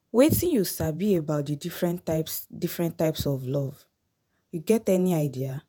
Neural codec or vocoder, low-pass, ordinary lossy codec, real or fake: vocoder, 48 kHz, 128 mel bands, Vocos; none; none; fake